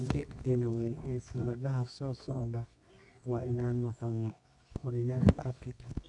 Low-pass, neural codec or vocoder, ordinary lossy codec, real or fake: 10.8 kHz; codec, 24 kHz, 0.9 kbps, WavTokenizer, medium music audio release; none; fake